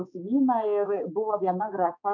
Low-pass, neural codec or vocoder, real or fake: 7.2 kHz; codec, 16 kHz, 4 kbps, X-Codec, HuBERT features, trained on balanced general audio; fake